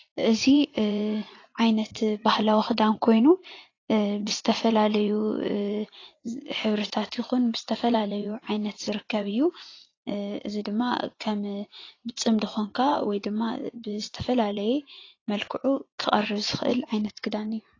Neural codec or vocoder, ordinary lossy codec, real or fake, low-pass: vocoder, 44.1 kHz, 80 mel bands, Vocos; AAC, 32 kbps; fake; 7.2 kHz